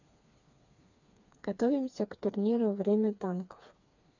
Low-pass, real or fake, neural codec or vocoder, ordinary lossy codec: 7.2 kHz; fake; codec, 16 kHz, 4 kbps, FreqCodec, smaller model; none